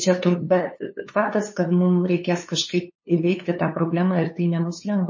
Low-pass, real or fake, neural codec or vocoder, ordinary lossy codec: 7.2 kHz; fake; codec, 16 kHz, 2 kbps, FunCodec, trained on LibriTTS, 25 frames a second; MP3, 32 kbps